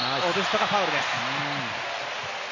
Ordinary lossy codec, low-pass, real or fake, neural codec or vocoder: none; 7.2 kHz; real; none